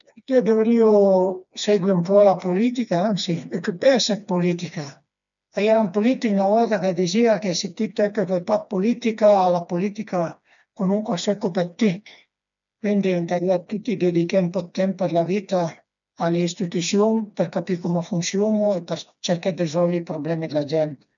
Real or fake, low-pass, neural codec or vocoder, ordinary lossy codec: fake; 7.2 kHz; codec, 16 kHz, 2 kbps, FreqCodec, smaller model; none